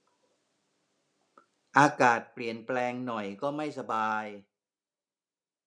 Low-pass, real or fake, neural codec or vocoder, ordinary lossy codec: none; real; none; none